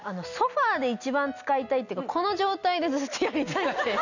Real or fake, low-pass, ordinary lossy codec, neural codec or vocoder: real; 7.2 kHz; none; none